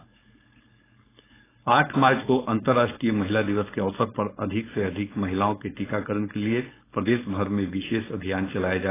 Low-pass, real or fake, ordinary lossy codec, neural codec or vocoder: 3.6 kHz; fake; AAC, 16 kbps; codec, 16 kHz, 4.8 kbps, FACodec